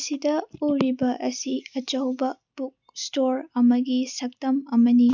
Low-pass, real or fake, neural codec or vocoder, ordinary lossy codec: 7.2 kHz; real; none; none